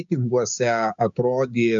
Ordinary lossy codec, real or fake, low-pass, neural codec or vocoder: MP3, 64 kbps; fake; 7.2 kHz; codec, 16 kHz, 6 kbps, DAC